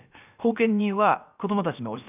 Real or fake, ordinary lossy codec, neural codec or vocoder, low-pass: fake; none; codec, 16 kHz, 0.7 kbps, FocalCodec; 3.6 kHz